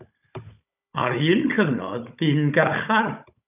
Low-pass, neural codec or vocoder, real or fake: 3.6 kHz; codec, 16 kHz, 16 kbps, FunCodec, trained on Chinese and English, 50 frames a second; fake